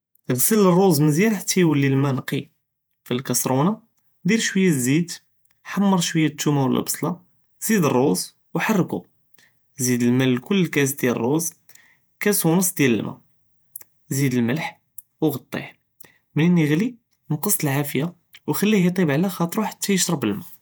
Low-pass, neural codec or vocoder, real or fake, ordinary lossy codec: none; vocoder, 48 kHz, 128 mel bands, Vocos; fake; none